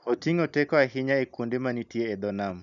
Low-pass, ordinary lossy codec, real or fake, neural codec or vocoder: 7.2 kHz; none; real; none